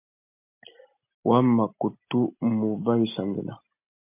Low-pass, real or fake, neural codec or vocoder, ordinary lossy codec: 3.6 kHz; real; none; MP3, 32 kbps